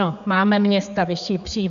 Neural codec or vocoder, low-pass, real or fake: codec, 16 kHz, 4 kbps, X-Codec, HuBERT features, trained on general audio; 7.2 kHz; fake